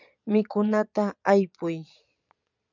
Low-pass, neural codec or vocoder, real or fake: 7.2 kHz; vocoder, 22.05 kHz, 80 mel bands, Vocos; fake